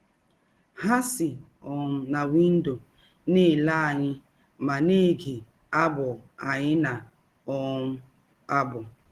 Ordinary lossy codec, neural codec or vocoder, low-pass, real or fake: Opus, 16 kbps; none; 14.4 kHz; real